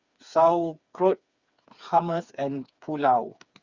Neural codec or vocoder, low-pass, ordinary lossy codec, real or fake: codec, 16 kHz, 4 kbps, FreqCodec, smaller model; 7.2 kHz; Opus, 64 kbps; fake